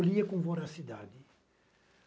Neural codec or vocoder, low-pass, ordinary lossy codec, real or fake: none; none; none; real